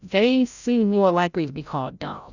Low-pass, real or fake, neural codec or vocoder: 7.2 kHz; fake; codec, 16 kHz, 0.5 kbps, FreqCodec, larger model